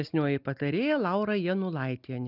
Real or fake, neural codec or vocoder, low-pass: real; none; 5.4 kHz